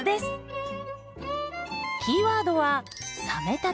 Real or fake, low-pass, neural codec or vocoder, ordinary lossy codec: real; none; none; none